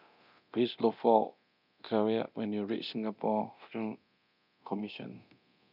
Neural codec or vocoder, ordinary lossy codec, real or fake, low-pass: codec, 24 kHz, 0.9 kbps, DualCodec; none; fake; 5.4 kHz